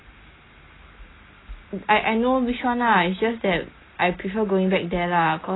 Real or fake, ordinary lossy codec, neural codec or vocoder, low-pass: real; AAC, 16 kbps; none; 7.2 kHz